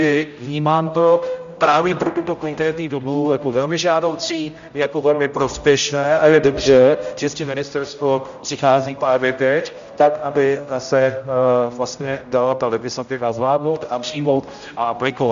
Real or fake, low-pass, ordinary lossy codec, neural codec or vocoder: fake; 7.2 kHz; MP3, 64 kbps; codec, 16 kHz, 0.5 kbps, X-Codec, HuBERT features, trained on general audio